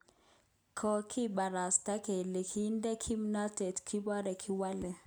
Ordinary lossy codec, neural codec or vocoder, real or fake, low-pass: none; none; real; none